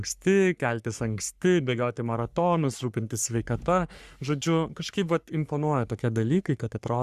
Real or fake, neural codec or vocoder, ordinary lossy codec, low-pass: fake; codec, 44.1 kHz, 3.4 kbps, Pupu-Codec; Opus, 64 kbps; 14.4 kHz